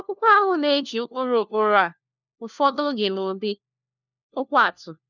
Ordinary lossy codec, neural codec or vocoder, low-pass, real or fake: none; codec, 16 kHz, 1 kbps, FunCodec, trained on LibriTTS, 50 frames a second; 7.2 kHz; fake